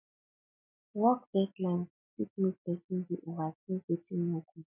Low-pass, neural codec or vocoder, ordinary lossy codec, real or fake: 3.6 kHz; none; none; real